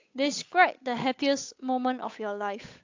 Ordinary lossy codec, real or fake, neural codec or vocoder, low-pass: AAC, 48 kbps; fake; codec, 16 kHz, 8 kbps, FunCodec, trained on Chinese and English, 25 frames a second; 7.2 kHz